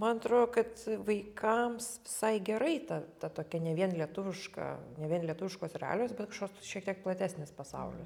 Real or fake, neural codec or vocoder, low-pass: real; none; 19.8 kHz